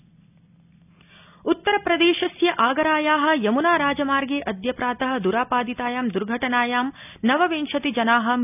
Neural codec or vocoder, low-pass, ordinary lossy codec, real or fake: none; 3.6 kHz; none; real